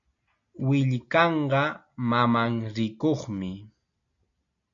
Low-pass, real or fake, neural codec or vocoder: 7.2 kHz; real; none